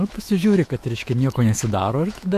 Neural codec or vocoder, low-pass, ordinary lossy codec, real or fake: autoencoder, 48 kHz, 128 numbers a frame, DAC-VAE, trained on Japanese speech; 14.4 kHz; AAC, 96 kbps; fake